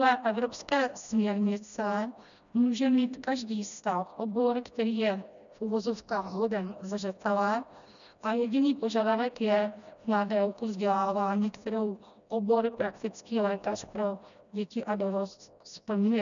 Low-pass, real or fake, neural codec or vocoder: 7.2 kHz; fake; codec, 16 kHz, 1 kbps, FreqCodec, smaller model